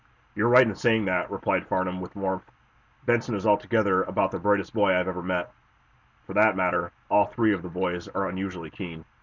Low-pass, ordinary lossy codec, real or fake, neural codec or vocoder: 7.2 kHz; Opus, 64 kbps; real; none